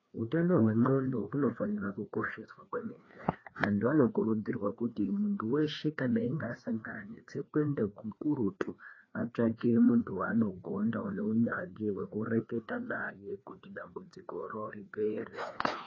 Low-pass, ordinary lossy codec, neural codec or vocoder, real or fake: 7.2 kHz; AAC, 32 kbps; codec, 16 kHz, 2 kbps, FreqCodec, larger model; fake